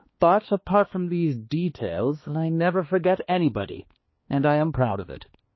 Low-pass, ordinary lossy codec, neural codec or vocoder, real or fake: 7.2 kHz; MP3, 24 kbps; codec, 16 kHz, 2 kbps, X-Codec, HuBERT features, trained on balanced general audio; fake